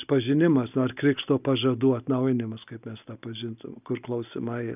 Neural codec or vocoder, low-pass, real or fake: none; 3.6 kHz; real